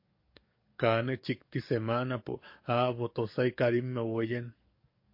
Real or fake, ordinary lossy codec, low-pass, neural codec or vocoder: fake; MP3, 32 kbps; 5.4 kHz; codec, 44.1 kHz, 7.8 kbps, DAC